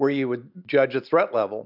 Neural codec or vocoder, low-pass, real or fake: none; 5.4 kHz; real